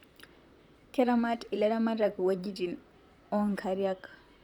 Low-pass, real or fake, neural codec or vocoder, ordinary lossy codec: 19.8 kHz; fake; vocoder, 44.1 kHz, 128 mel bands, Pupu-Vocoder; none